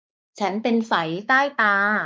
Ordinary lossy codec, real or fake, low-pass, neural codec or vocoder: none; fake; none; codec, 16 kHz, 4 kbps, X-Codec, WavLM features, trained on Multilingual LibriSpeech